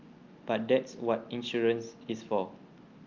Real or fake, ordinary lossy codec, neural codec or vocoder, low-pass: real; Opus, 24 kbps; none; 7.2 kHz